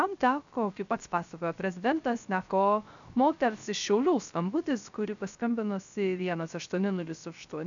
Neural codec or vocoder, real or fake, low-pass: codec, 16 kHz, 0.3 kbps, FocalCodec; fake; 7.2 kHz